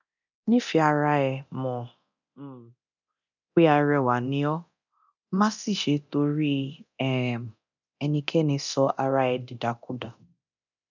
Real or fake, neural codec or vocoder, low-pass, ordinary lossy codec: fake; codec, 24 kHz, 0.9 kbps, DualCodec; 7.2 kHz; none